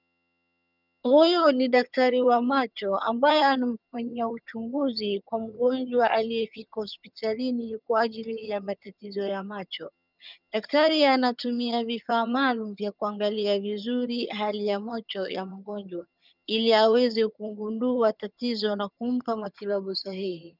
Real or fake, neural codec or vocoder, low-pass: fake; vocoder, 22.05 kHz, 80 mel bands, HiFi-GAN; 5.4 kHz